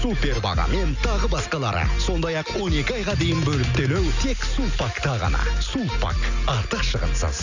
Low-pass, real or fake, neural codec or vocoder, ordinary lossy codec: 7.2 kHz; real; none; none